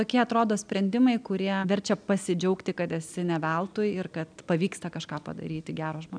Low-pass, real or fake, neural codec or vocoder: 9.9 kHz; real; none